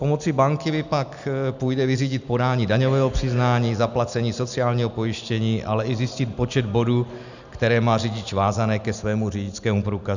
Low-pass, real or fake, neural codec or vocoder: 7.2 kHz; real; none